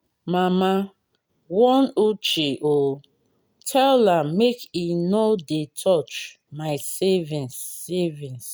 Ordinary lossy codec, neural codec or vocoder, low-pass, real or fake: none; none; none; real